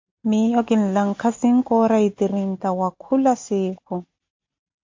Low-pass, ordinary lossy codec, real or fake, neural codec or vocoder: 7.2 kHz; MP3, 48 kbps; real; none